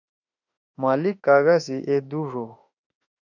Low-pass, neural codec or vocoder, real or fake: 7.2 kHz; autoencoder, 48 kHz, 32 numbers a frame, DAC-VAE, trained on Japanese speech; fake